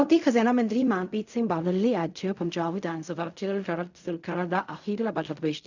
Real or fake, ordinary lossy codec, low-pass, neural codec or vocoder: fake; none; 7.2 kHz; codec, 16 kHz in and 24 kHz out, 0.4 kbps, LongCat-Audio-Codec, fine tuned four codebook decoder